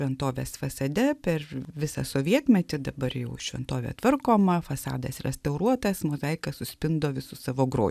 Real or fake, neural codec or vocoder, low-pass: real; none; 14.4 kHz